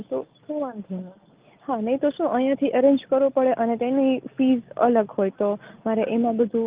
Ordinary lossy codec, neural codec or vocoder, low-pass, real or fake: Opus, 32 kbps; none; 3.6 kHz; real